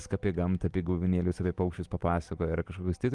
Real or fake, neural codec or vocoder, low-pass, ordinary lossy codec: real; none; 10.8 kHz; Opus, 24 kbps